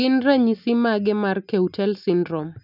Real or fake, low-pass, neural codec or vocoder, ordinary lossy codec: real; 5.4 kHz; none; none